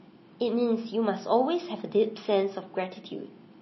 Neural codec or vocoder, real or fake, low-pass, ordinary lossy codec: none; real; 7.2 kHz; MP3, 24 kbps